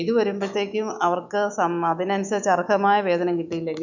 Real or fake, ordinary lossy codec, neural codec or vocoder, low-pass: fake; none; autoencoder, 48 kHz, 128 numbers a frame, DAC-VAE, trained on Japanese speech; 7.2 kHz